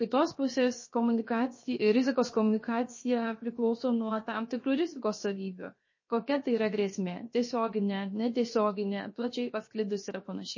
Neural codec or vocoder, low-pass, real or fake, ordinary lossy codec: codec, 16 kHz, 0.7 kbps, FocalCodec; 7.2 kHz; fake; MP3, 32 kbps